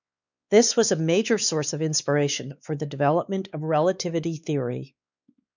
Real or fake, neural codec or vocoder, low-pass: fake; codec, 16 kHz, 4 kbps, X-Codec, WavLM features, trained on Multilingual LibriSpeech; 7.2 kHz